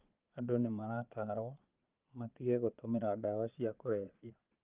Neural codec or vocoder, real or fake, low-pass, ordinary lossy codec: vocoder, 22.05 kHz, 80 mel bands, Vocos; fake; 3.6 kHz; Opus, 24 kbps